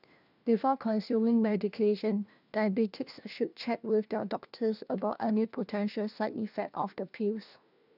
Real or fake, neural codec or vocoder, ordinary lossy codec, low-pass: fake; codec, 16 kHz, 1 kbps, FunCodec, trained on LibriTTS, 50 frames a second; none; 5.4 kHz